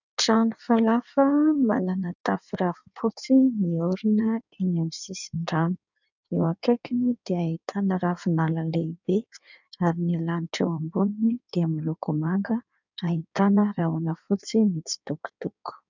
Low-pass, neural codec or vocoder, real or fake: 7.2 kHz; codec, 16 kHz in and 24 kHz out, 1.1 kbps, FireRedTTS-2 codec; fake